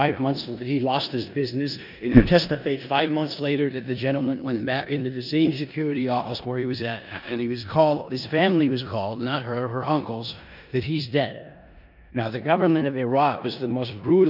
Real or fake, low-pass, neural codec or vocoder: fake; 5.4 kHz; codec, 16 kHz in and 24 kHz out, 0.9 kbps, LongCat-Audio-Codec, four codebook decoder